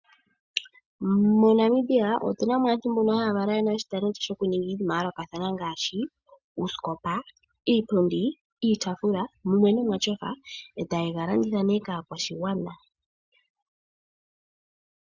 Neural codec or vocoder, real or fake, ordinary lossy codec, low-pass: none; real; Opus, 64 kbps; 7.2 kHz